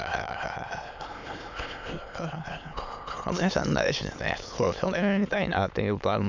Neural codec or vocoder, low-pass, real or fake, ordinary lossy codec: autoencoder, 22.05 kHz, a latent of 192 numbers a frame, VITS, trained on many speakers; 7.2 kHz; fake; MP3, 64 kbps